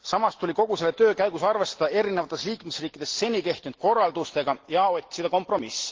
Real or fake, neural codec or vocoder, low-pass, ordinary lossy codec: real; none; 7.2 kHz; Opus, 16 kbps